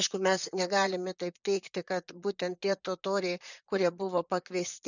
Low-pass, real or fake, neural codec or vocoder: 7.2 kHz; fake; vocoder, 44.1 kHz, 128 mel bands, Pupu-Vocoder